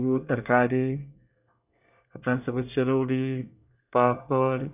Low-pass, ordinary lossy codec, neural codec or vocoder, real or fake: 3.6 kHz; none; codec, 24 kHz, 1 kbps, SNAC; fake